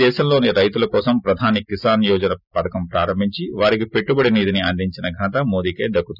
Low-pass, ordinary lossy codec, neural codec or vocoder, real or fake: 5.4 kHz; none; none; real